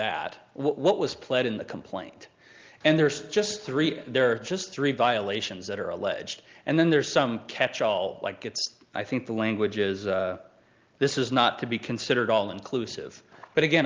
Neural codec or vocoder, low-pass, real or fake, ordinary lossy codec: none; 7.2 kHz; real; Opus, 32 kbps